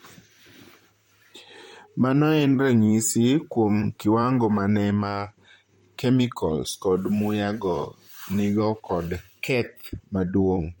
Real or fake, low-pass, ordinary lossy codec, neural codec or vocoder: fake; 19.8 kHz; MP3, 64 kbps; vocoder, 44.1 kHz, 128 mel bands, Pupu-Vocoder